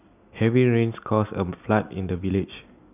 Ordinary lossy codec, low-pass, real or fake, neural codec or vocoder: none; 3.6 kHz; real; none